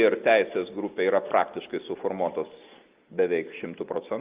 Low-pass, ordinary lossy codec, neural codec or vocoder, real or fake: 3.6 kHz; Opus, 32 kbps; none; real